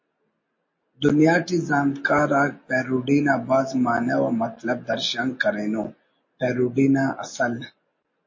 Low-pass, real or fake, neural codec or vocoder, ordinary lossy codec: 7.2 kHz; real; none; MP3, 32 kbps